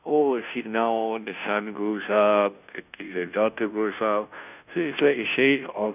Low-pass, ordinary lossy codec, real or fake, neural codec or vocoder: 3.6 kHz; none; fake; codec, 16 kHz, 0.5 kbps, FunCodec, trained on Chinese and English, 25 frames a second